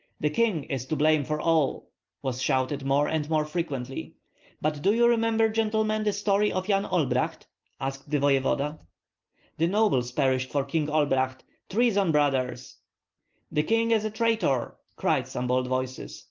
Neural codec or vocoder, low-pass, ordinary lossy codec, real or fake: none; 7.2 kHz; Opus, 32 kbps; real